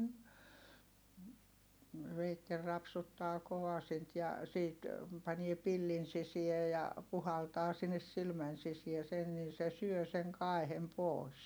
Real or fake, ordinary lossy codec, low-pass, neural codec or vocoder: real; none; none; none